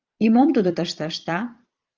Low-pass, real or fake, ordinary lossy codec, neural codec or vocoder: 7.2 kHz; fake; Opus, 32 kbps; vocoder, 22.05 kHz, 80 mel bands, Vocos